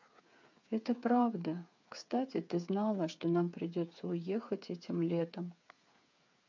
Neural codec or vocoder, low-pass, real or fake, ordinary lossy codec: codec, 16 kHz, 8 kbps, FreqCodec, smaller model; 7.2 kHz; fake; MP3, 48 kbps